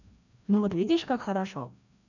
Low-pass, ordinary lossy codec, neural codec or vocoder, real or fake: 7.2 kHz; none; codec, 16 kHz, 1 kbps, FreqCodec, larger model; fake